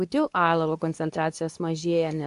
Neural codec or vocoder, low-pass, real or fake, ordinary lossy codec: codec, 24 kHz, 0.9 kbps, WavTokenizer, medium speech release version 2; 10.8 kHz; fake; AAC, 64 kbps